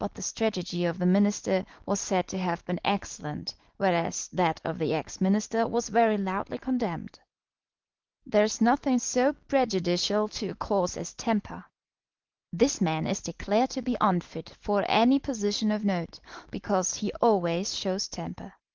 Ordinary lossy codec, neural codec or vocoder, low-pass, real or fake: Opus, 16 kbps; none; 7.2 kHz; real